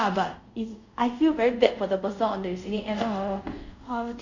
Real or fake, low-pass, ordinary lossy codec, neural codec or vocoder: fake; 7.2 kHz; none; codec, 24 kHz, 0.5 kbps, DualCodec